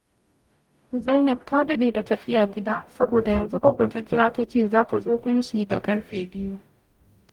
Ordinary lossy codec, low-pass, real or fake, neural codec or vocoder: Opus, 32 kbps; 19.8 kHz; fake; codec, 44.1 kHz, 0.9 kbps, DAC